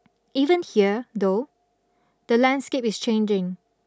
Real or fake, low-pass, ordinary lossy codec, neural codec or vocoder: real; none; none; none